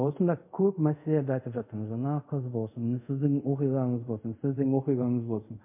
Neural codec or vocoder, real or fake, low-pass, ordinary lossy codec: codec, 24 kHz, 0.5 kbps, DualCodec; fake; 3.6 kHz; MP3, 24 kbps